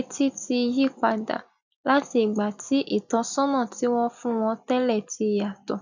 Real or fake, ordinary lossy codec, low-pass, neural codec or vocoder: real; none; 7.2 kHz; none